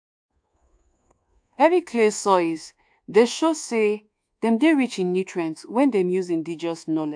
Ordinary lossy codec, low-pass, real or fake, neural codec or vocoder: AAC, 64 kbps; 9.9 kHz; fake; codec, 24 kHz, 1.2 kbps, DualCodec